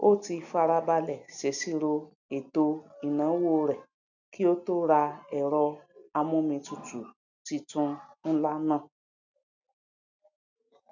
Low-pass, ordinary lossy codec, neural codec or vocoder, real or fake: 7.2 kHz; none; none; real